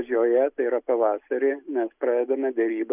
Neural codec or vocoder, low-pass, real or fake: none; 3.6 kHz; real